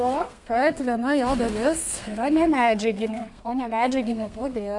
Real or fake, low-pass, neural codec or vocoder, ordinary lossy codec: fake; 10.8 kHz; codec, 32 kHz, 1.9 kbps, SNAC; MP3, 96 kbps